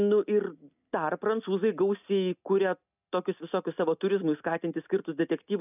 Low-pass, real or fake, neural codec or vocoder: 3.6 kHz; real; none